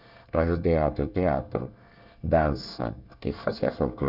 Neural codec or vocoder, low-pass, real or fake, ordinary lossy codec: codec, 24 kHz, 1 kbps, SNAC; 5.4 kHz; fake; none